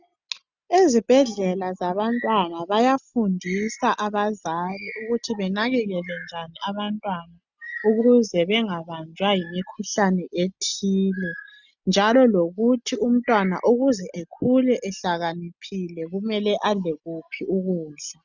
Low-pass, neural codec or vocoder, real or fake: 7.2 kHz; none; real